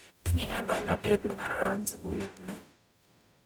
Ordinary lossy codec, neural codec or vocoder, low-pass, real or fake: none; codec, 44.1 kHz, 0.9 kbps, DAC; none; fake